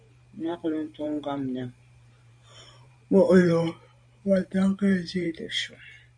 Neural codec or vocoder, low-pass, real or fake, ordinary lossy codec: vocoder, 44.1 kHz, 128 mel bands every 512 samples, BigVGAN v2; 9.9 kHz; fake; MP3, 64 kbps